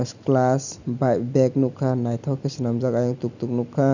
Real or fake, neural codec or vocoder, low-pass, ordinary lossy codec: real; none; 7.2 kHz; none